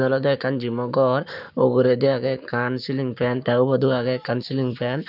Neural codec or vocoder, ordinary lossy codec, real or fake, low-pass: codec, 44.1 kHz, 7.8 kbps, Pupu-Codec; none; fake; 5.4 kHz